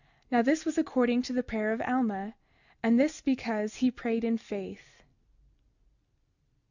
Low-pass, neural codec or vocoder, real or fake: 7.2 kHz; none; real